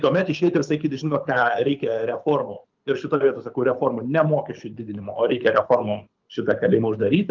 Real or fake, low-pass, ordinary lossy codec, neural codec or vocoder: fake; 7.2 kHz; Opus, 24 kbps; codec, 24 kHz, 6 kbps, HILCodec